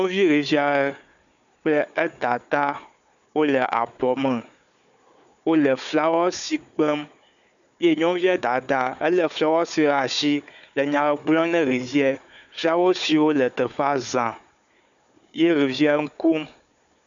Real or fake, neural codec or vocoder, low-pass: fake; codec, 16 kHz, 4 kbps, FunCodec, trained on Chinese and English, 50 frames a second; 7.2 kHz